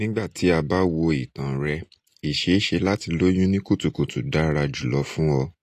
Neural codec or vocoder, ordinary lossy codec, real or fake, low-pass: none; AAC, 48 kbps; real; 14.4 kHz